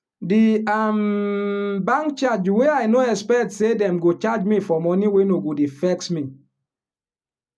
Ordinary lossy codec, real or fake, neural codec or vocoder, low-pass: none; real; none; none